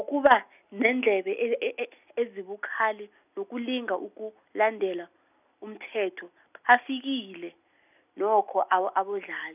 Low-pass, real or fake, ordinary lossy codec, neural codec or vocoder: 3.6 kHz; real; none; none